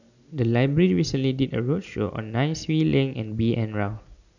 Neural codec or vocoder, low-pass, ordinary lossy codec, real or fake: none; 7.2 kHz; none; real